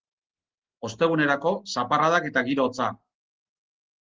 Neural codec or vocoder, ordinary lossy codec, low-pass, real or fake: none; Opus, 16 kbps; 7.2 kHz; real